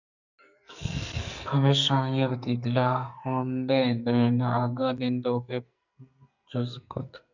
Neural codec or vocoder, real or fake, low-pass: codec, 44.1 kHz, 2.6 kbps, SNAC; fake; 7.2 kHz